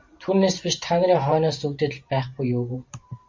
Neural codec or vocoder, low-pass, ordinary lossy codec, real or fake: vocoder, 44.1 kHz, 128 mel bands every 512 samples, BigVGAN v2; 7.2 kHz; MP3, 64 kbps; fake